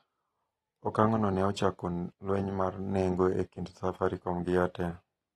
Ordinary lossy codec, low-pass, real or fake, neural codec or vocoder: AAC, 32 kbps; 19.8 kHz; real; none